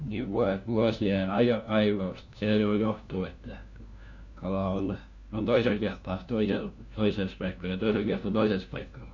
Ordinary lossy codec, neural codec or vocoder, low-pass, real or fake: none; codec, 16 kHz, 1 kbps, FunCodec, trained on LibriTTS, 50 frames a second; 7.2 kHz; fake